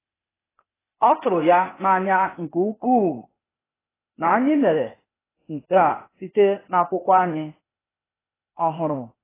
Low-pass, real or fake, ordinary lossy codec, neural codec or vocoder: 3.6 kHz; fake; AAC, 16 kbps; codec, 16 kHz, 0.8 kbps, ZipCodec